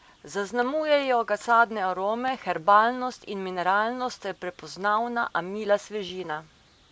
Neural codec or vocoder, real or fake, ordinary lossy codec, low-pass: none; real; none; none